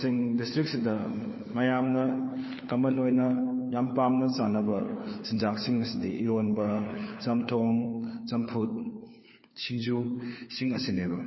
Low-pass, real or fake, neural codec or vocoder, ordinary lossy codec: 7.2 kHz; fake; codec, 16 kHz, 4 kbps, FunCodec, trained on LibriTTS, 50 frames a second; MP3, 24 kbps